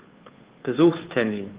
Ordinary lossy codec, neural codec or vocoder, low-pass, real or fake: Opus, 16 kbps; codec, 16 kHz, 2 kbps, FunCodec, trained on Chinese and English, 25 frames a second; 3.6 kHz; fake